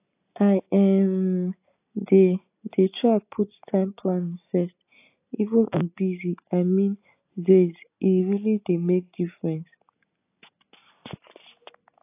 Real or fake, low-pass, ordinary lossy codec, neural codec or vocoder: real; 3.6 kHz; AAC, 24 kbps; none